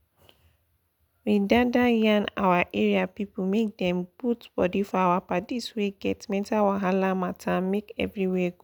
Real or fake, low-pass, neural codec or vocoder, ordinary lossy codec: real; 19.8 kHz; none; none